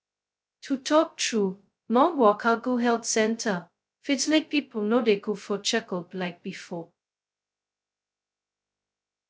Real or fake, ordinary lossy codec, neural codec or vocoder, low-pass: fake; none; codec, 16 kHz, 0.2 kbps, FocalCodec; none